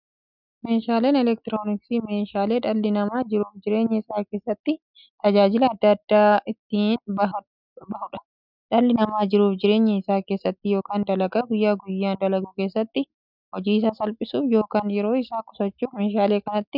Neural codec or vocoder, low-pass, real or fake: none; 5.4 kHz; real